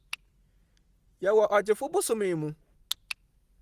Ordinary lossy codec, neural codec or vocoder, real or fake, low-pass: Opus, 24 kbps; none; real; 14.4 kHz